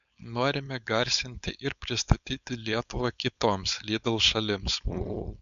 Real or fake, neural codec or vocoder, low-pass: fake; codec, 16 kHz, 4.8 kbps, FACodec; 7.2 kHz